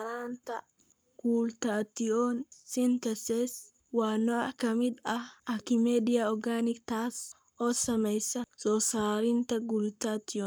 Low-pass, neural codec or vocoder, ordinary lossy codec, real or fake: none; codec, 44.1 kHz, 7.8 kbps, Pupu-Codec; none; fake